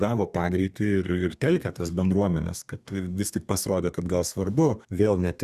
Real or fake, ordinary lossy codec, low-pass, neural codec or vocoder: fake; Opus, 64 kbps; 14.4 kHz; codec, 32 kHz, 1.9 kbps, SNAC